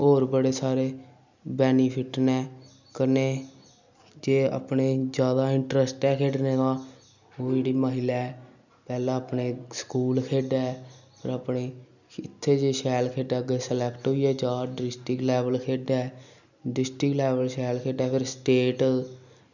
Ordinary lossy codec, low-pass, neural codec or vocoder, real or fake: none; 7.2 kHz; none; real